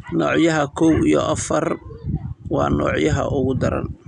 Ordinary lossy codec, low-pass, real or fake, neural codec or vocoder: none; 10.8 kHz; real; none